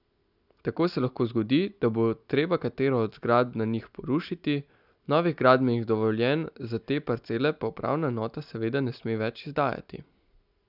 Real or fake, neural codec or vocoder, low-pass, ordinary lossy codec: real; none; 5.4 kHz; none